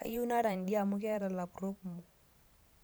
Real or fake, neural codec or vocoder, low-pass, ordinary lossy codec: fake; vocoder, 44.1 kHz, 128 mel bands every 512 samples, BigVGAN v2; none; none